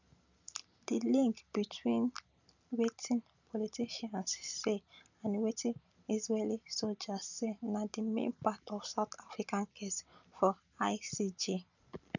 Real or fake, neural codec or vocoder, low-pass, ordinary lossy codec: real; none; 7.2 kHz; none